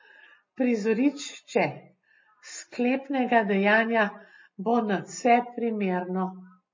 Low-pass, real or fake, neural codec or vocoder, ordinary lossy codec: 7.2 kHz; real; none; MP3, 32 kbps